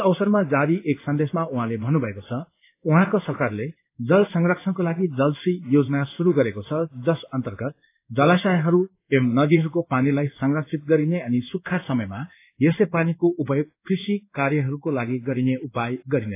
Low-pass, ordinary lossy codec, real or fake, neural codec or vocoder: 3.6 kHz; AAC, 24 kbps; fake; codec, 16 kHz in and 24 kHz out, 1 kbps, XY-Tokenizer